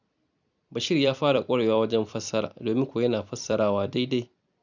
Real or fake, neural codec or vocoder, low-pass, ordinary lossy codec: fake; vocoder, 44.1 kHz, 128 mel bands every 256 samples, BigVGAN v2; 7.2 kHz; none